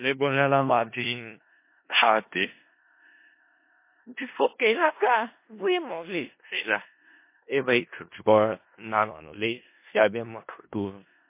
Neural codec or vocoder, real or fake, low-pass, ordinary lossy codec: codec, 16 kHz in and 24 kHz out, 0.4 kbps, LongCat-Audio-Codec, four codebook decoder; fake; 3.6 kHz; MP3, 24 kbps